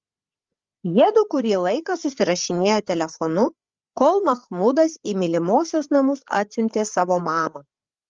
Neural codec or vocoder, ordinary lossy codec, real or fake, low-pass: codec, 16 kHz, 8 kbps, FreqCodec, larger model; Opus, 32 kbps; fake; 7.2 kHz